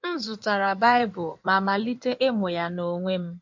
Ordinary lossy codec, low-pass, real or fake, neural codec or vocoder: MP3, 48 kbps; 7.2 kHz; fake; codec, 16 kHz in and 24 kHz out, 2.2 kbps, FireRedTTS-2 codec